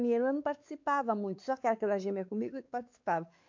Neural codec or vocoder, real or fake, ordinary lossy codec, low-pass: codec, 16 kHz, 4 kbps, X-Codec, WavLM features, trained on Multilingual LibriSpeech; fake; AAC, 48 kbps; 7.2 kHz